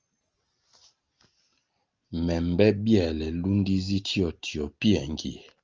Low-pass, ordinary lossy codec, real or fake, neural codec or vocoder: 7.2 kHz; Opus, 24 kbps; real; none